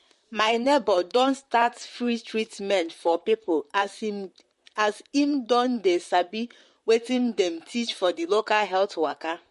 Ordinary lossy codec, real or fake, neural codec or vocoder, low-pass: MP3, 48 kbps; fake; vocoder, 44.1 kHz, 128 mel bands, Pupu-Vocoder; 14.4 kHz